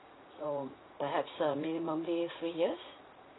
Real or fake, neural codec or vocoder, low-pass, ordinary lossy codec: fake; vocoder, 44.1 kHz, 80 mel bands, Vocos; 7.2 kHz; AAC, 16 kbps